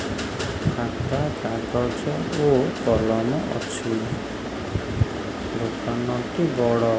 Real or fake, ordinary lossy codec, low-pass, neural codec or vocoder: real; none; none; none